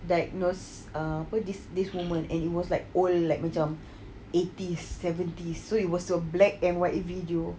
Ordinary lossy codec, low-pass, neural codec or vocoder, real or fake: none; none; none; real